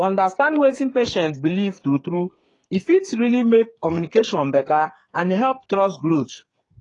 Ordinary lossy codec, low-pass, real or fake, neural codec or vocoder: AAC, 32 kbps; 10.8 kHz; fake; codec, 32 kHz, 1.9 kbps, SNAC